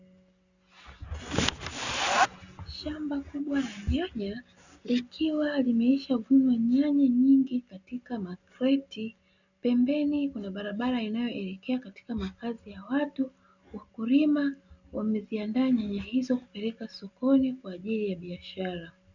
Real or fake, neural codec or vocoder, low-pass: real; none; 7.2 kHz